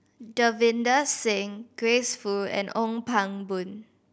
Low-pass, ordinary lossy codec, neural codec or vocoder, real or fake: none; none; none; real